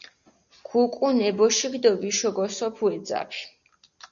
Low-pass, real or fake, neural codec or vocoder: 7.2 kHz; real; none